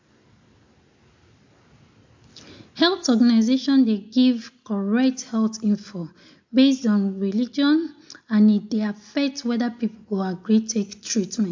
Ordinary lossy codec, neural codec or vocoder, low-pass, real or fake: MP3, 48 kbps; none; 7.2 kHz; real